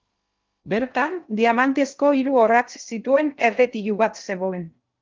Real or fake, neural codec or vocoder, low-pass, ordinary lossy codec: fake; codec, 16 kHz in and 24 kHz out, 0.6 kbps, FocalCodec, streaming, 2048 codes; 7.2 kHz; Opus, 32 kbps